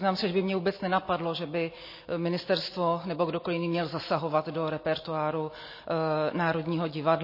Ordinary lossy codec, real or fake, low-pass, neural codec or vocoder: MP3, 24 kbps; real; 5.4 kHz; none